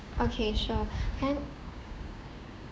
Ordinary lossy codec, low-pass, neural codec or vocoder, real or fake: none; none; codec, 16 kHz, 6 kbps, DAC; fake